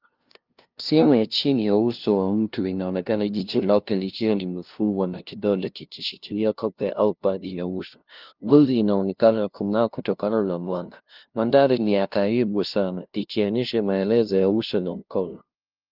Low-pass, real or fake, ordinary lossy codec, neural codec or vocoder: 5.4 kHz; fake; Opus, 24 kbps; codec, 16 kHz, 0.5 kbps, FunCodec, trained on LibriTTS, 25 frames a second